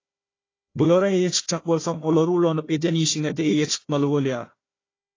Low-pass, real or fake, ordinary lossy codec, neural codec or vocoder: 7.2 kHz; fake; AAC, 32 kbps; codec, 16 kHz, 1 kbps, FunCodec, trained on Chinese and English, 50 frames a second